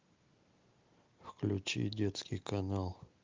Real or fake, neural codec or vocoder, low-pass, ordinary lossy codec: real; none; 7.2 kHz; Opus, 32 kbps